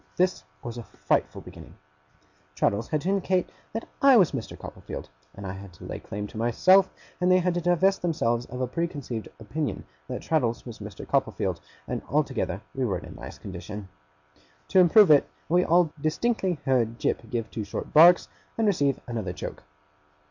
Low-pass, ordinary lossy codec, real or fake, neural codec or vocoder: 7.2 kHz; MP3, 48 kbps; real; none